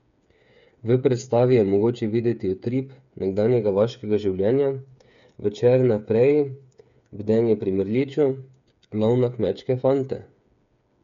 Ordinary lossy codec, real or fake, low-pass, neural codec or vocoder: MP3, 64 kbps; fake; 7.2 kHz; codec, 16 kHz, 8 kbps, FreqCodec, smaller model